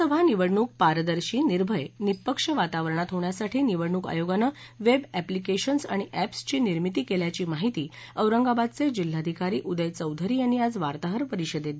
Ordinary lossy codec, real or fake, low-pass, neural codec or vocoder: none; real; none; none